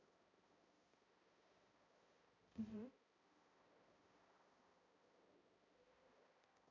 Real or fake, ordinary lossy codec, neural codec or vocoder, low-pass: fake; none; codec, 16 kHz, 0.5 kbps, X-Codec, HuBERT features, trained on balanced general audio; 7.2 kHz